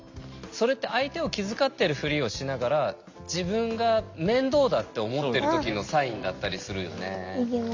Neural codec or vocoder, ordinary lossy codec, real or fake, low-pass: none; MP3, 64 kbps; real; 7.2 kHz